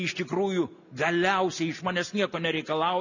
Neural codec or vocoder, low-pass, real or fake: none; 7.2 kHz; real